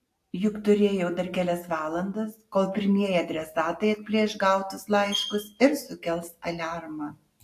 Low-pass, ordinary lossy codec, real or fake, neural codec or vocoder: 14.4 kHz; AAC, 64 kbps; real; none